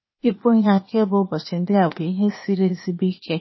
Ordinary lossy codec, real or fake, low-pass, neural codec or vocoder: MP3, 24 kbps; fake; 7.2 kHz; codec, 16 kHz, 0.8 kbps, ZipCodec